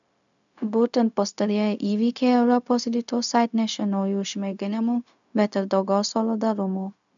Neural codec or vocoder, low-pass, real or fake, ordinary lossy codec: codec, 16 kHz, 0.4 kbps, LongCat-Audio-Codec; 7.2 kHz; fake; MP3, 96 kbps